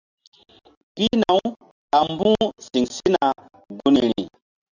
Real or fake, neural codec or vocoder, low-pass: real; none; 7.2 kHz